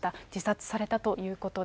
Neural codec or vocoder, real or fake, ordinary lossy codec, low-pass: none; real; none; none